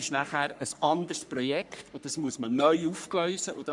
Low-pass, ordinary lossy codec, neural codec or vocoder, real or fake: 10.8 kHz; none; codec, 44.1 kHz, 3.4 kbps, Pupu-Codec; fake